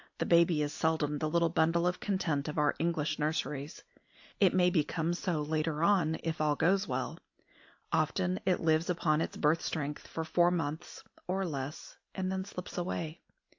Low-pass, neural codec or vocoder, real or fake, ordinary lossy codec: 7.2 kHz; none; real; AAC, 48 kbps